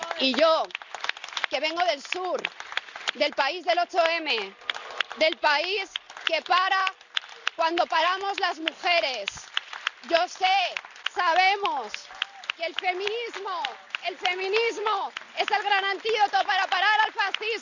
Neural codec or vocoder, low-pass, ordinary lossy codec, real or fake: none; 7.2 kHz; none; real